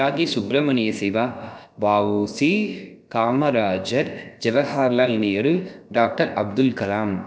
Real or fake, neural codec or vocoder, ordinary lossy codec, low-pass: fake; codec, 16 kHz, about 1 kbps, DyCAST, with the encoder's durations; none; none